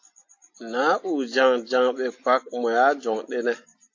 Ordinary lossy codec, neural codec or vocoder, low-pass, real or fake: AAC, 48 kbps; none; 7.2 kHz; real